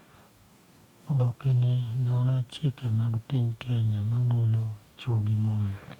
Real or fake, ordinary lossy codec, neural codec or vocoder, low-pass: fake; Opus, 64 kbps; codec, 44.1 kHz, 2.6 kbps, DAC; 19.8 kHz